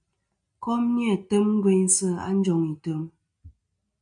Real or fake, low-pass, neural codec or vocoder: real; 9.9 kHz; none